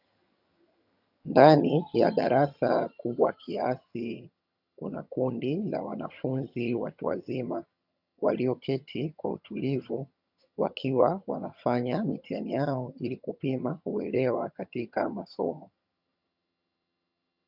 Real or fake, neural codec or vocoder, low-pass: fake; vocoder, 22.05 kHz, 80 mel bands, HiFi-GAN; 5.4 kHz